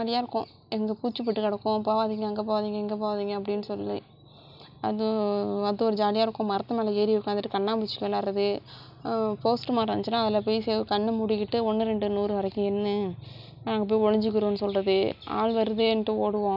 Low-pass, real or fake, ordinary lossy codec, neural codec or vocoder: 5.4 kHz; real; none; none